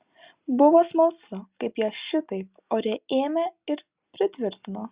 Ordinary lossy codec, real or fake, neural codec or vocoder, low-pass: Opus, 64 kbps; real; none; 3.6 kHz